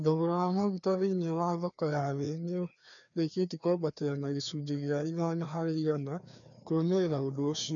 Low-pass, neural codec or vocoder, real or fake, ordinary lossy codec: 7.2 kHz; codec, 16 kHz, 2 kbps, FreqCodec, larger model; fake; none